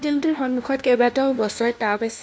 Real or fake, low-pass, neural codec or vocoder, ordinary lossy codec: fake; none; codec, 16 kHz, 1 kbps, FunCodec, trained on LibriTTS, 50 frames a second; none